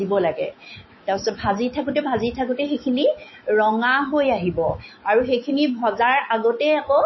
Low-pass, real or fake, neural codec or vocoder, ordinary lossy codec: 7.2 kHz; fake; autoencoder, 48 kHz, 128 numbers a frame, DAC-VAE, trained on Japanese speech; MP3, 24 kbps